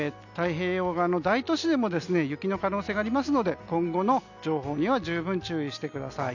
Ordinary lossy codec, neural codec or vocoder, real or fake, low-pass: none; none; real; 7.2 kHz